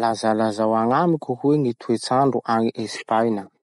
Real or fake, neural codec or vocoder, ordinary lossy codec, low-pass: real; none; MP3, 48 kbps; 19.8 kHz